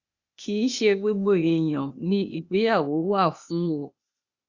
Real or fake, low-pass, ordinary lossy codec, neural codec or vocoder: fake; 7.2 kHz; Opus, 64 kbps; codec, 16 kHz, 0.8 kbps, ZipCodec